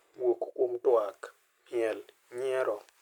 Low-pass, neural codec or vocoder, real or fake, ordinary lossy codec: 19.8 kHz; none; real; none